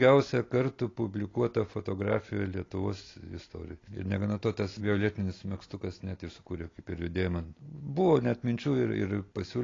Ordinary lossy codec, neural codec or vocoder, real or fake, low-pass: AAC, 32 kbps; none; real; 7.2 kHz